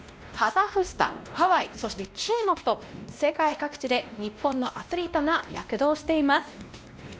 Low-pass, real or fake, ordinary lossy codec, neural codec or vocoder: none; fake; none; codec, 16 kHz, 1 kbps, X-Codec, WavLM features, trained on Multilingual LibriSpeech